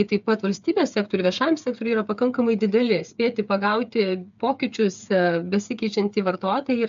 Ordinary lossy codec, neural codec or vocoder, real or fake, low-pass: MP3, 64 kbps; codec, 16 kHz, 8 kbps, FreqCodec, smaller model; fake; 7.2 kHz